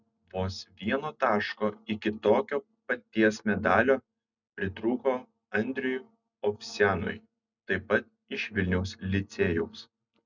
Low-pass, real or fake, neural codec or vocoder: 7.2 kHz; real; none